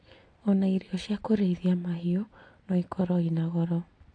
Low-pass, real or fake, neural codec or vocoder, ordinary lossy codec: 9.9 kHz; real; none; AAC, 48 kbps